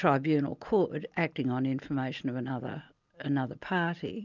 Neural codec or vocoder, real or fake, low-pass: none; real; 7.2 kHz